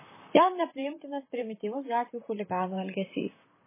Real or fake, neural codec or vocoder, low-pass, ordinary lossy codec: fake; vocoder, 44.1 kHz, 128 mel bands, Pupu-Vocoder; 3.6 kHz; MP3, 16 kbps